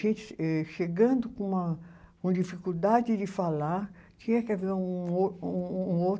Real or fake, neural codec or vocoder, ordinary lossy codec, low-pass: real; none; none; none